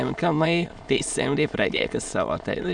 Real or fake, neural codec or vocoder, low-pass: fake; autoencoder, 22.05 kHz, a latent of 192 numbers a frame, VITS, trained on many speakers; 9.9 kHz